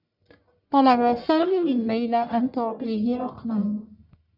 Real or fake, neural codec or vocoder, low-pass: fake; codec, 44.1 kHz, 1.7 kbps, Pupu-Codec; 5.4 kHz